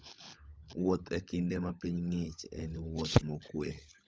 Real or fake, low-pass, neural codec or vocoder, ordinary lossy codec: fake; none; codec, 16 kHz, 16 kbps, FunCodec, trained on LibriTTS, 50 frames a second; none